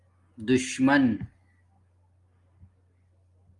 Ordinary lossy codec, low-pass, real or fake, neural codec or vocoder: Opus, 32 kbps; 10.8 kHz; real; none